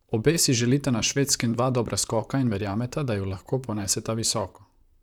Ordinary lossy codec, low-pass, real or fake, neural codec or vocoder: none; 19.8 kHz; fake; vocoder, 44.1 kHz, 128 mel bands, Pupu-Vocoder